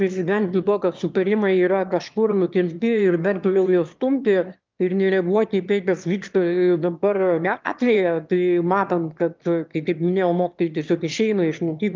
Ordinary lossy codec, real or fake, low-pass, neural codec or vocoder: Opus, 24 kbps; fake; 7.2 kHz; autoencoder, 22.05 kHz, a latent of 192 numbers a frame, VITS, trained on one speaker